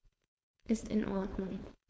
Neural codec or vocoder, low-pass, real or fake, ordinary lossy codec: codec, 16 kHz, 4.8 kbps, FACodec; none; fake; none